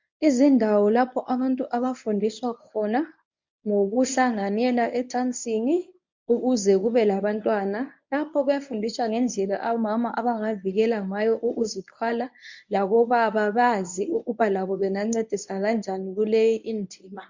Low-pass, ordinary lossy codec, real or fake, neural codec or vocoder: 7.2 kHz; MP3, 64 kbps; fake; codec, 24 kHz, 0.9 kbps, WavTokenizer, medium speech release version 1